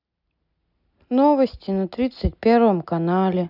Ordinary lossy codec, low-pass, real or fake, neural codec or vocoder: none; 5.4 kHz; real; none